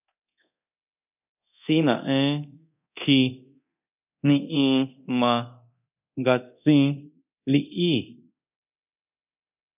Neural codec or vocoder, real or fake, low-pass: codec, 24 kHz, 0.9 kbps, DualCodec; fake; 3.6 kHz